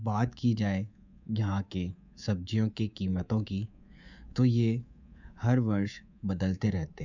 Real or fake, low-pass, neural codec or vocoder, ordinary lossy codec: fake; 7.2 kHz; codec, 24 kHz, 3.1 kbps, DualCodec; none